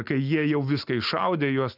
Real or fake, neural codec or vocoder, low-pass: real; none; 5.4 kHz